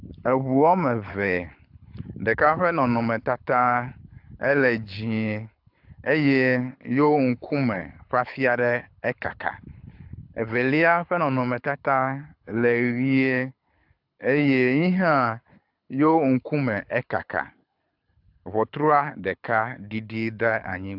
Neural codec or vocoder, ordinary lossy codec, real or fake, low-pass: codec, 24 kHz, 6 kbps, HILCodec; AAC, 48 kbps; fake; 5.4 kHz